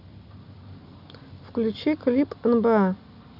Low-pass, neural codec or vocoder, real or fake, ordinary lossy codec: 5.4 kHz; none; real; none